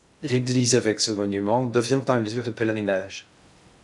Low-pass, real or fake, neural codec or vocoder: 10.8 kHz; fake; codec, 16 kHz in and 24 kHz out, 0.6 kbps, FocalCodec, streaming, 2048 codes